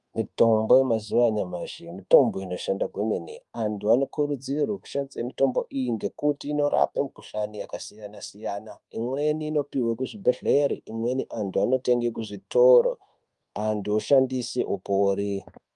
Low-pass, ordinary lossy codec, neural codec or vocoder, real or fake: 10.8 kHz; Opus, 32 kbps; codec, 24 kHz, 1.2 kbps, DualCodec; fake